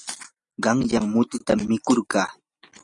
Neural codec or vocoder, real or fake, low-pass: none; real; 10.8 kHz